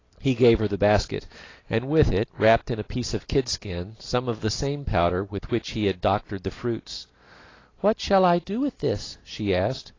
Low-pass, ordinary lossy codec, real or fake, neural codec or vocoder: 7.2 kHz; AAC, 32 kbps; real; none